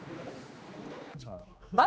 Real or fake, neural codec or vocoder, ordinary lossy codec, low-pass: fake; codec, 16 kHz, 2 kbps, X-Codec, HuBERT features, trained on general audio; none; none